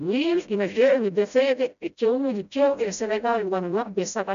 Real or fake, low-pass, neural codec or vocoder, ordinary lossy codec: fake; 7.2 kHz; codec, 16 kHz, 0.5 kbps, FreqCodec, smaller model; none